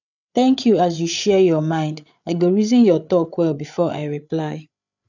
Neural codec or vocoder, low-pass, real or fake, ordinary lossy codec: codec, 16 kHz, 8 kbps, FreqCodec, larger model; 7.2 kHz; fake; none